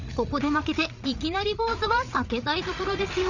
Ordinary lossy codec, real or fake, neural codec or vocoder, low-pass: none; fake; codec, 16 kHz, 8 kbps, FreqCodec, larger model; 7.2 kHz